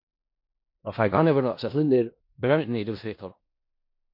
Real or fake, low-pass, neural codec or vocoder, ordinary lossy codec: fake; 5.4 kHz; codec, 16 kHz in and 24 kHz out, 0.4 kbps, LongCat-Audio-Codec, four codebook decoder; MP3, 32 kbps